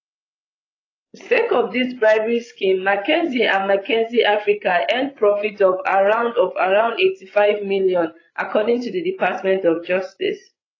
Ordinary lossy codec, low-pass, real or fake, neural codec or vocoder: AAC, 32 kbps; 7.2 kHz; fake; codec, 16 kHz, 16 kbps, FreqCodec, larger model